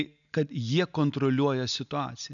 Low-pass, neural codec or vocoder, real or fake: 7.2 kHz; none; real